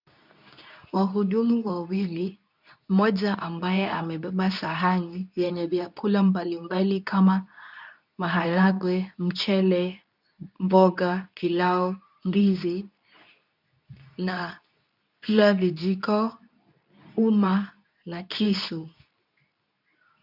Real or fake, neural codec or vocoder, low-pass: fake; codec, 24 kHz, 0.9 kbps, WavTokenizer, medium speech release version 2; 5.4 kHz